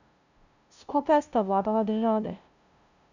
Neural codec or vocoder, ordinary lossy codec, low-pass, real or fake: codec, 16 kHz, 0.5 kbps, FunCodec, trained on LibriTTS, 25 frames a second; none; 7.2 kHz; fake